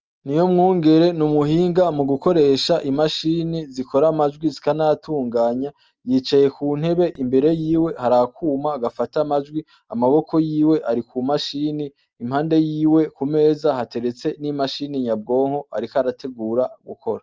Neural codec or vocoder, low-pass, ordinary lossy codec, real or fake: none; 7.2 kHz; Opus, 24 kbps; real